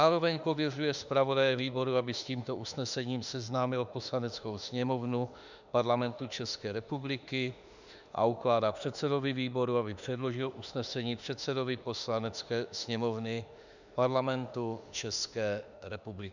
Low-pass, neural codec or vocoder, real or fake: 7.2 kHz; autoencoder, 48 kHz, 32 numbers a frame, DAC-VAE, trained on Japanese speech; fake